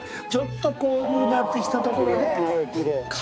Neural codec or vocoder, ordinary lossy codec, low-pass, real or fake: codec, 16 kHz, 4 kbps, X-Codec, HuBERT features, trained on balanced general audio; none; none; fake